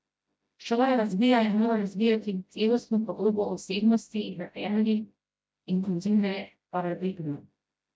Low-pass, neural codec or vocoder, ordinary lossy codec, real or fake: none; codec, 16 kHz, 0.5 kbps, FreqCodec, smaller model; none; fake